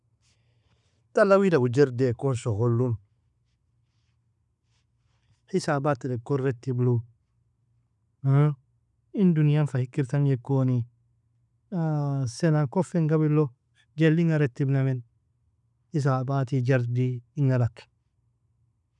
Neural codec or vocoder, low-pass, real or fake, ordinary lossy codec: none; 10.8 kHz; real; none